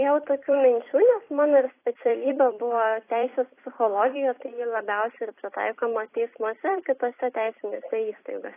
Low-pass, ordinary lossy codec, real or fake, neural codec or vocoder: 3.6 kHz; AAC, 24 kbps; fake; vocoder, 44.1 kHz, 128 mel bands every 256 samples, BigVGAN v2